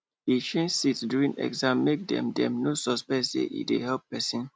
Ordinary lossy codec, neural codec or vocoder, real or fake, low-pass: none; none; real; none